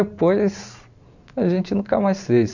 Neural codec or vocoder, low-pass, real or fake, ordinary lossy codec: vocoder, 44.1 kHz, 128 mel bands every 512 samples, BigVGAN v2; 7.2 kHz; fake; none